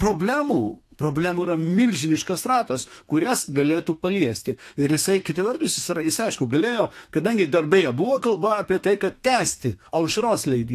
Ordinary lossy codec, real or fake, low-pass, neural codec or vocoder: AAC, 64 kbps; fake; 14.4 kHz; codec, 32 kHz, 1.9 kbps, SNAC